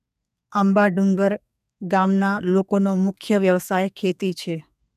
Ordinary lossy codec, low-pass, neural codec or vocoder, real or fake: none; 14.4 kHz; codec, 32 kHz, 1.9 kbps, SNAC; fake